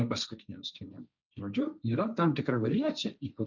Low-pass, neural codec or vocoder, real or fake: 7.2 kHz; codec, 16 kHz, 1.1 kbps, Voila-Tokenizer; fake